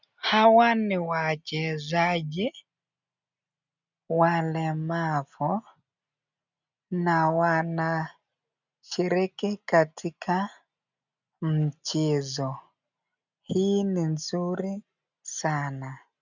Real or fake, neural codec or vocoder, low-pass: real; none; 7.2 kHz